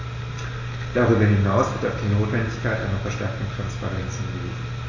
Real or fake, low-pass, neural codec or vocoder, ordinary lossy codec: real; 7.2 kHz; none; AAC, 32 kbps